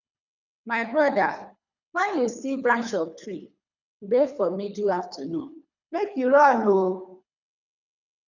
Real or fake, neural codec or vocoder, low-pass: fake; codec, 24 kHz, 3 kbps, HILCodec; 7.2 kHz